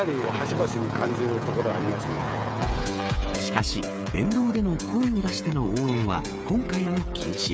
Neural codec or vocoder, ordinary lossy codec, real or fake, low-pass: codec, 16 kHz, 8 kbps, FreqCodec, smaller model; none; fake; none